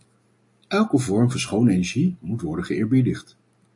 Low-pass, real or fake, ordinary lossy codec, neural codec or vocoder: 10.8 kHz; real; MP3, 48 kbps; none